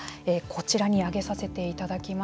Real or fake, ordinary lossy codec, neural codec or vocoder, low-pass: real; none; none; none